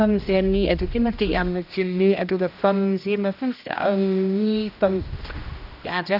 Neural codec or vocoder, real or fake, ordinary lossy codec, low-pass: codec, 16 kHz, 1 kbps, X-Codec, HuBERT features, trained on general audio; fake; none; 5.4 kHz